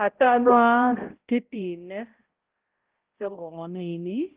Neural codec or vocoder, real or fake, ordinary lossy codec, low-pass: codec, 16 kHz, 0.5 kbps, X-Codec, HuBERT features, trained on balanced general audio; fake; Opus, 16 kbps; 3.6 kHz